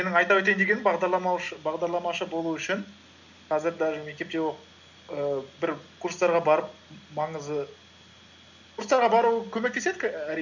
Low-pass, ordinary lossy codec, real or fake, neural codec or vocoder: 7.2 kHz; none; real; none